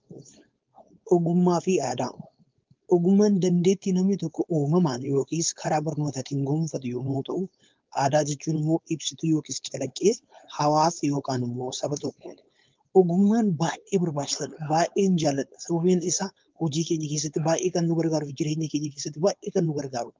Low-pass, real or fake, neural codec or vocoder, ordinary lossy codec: 7.2 kHz; fake; codec, 16 kHz, 4.8 kbps, FACodec; Opus, 24 kbps